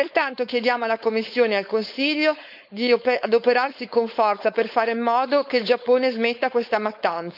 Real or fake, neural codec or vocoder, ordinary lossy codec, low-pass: fake; codec, 16 kHz, 4.8 kbps, FACodec; none; 5.4 kHz